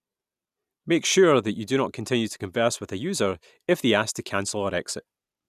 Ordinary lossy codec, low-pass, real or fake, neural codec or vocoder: none; 14.4 kHz; real; none